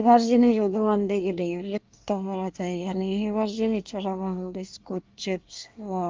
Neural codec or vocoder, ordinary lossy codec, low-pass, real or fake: codec, 24 kHz, 1 kbps, SNAC; Opus, 32 kbps; 7.2 kHz; fake